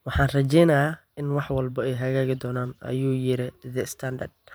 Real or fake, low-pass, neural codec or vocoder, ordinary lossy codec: fake; none; vocoder, 44.1 kHz, 128 mel bands every 512 samples, BigVGAN v2; none